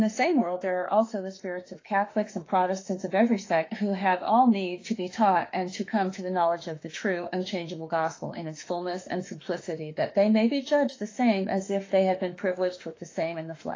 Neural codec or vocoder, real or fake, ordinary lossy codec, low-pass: autoencoder, 48 kHz, 32 numbers a frame, DAC-VAE, trained on Japanese speech; fake; AAC, 32 kbps; 7.2 kHz